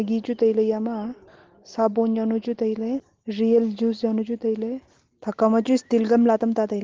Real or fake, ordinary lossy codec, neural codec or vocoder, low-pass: real; Opus, 16 kbps; none; 7.2 kHz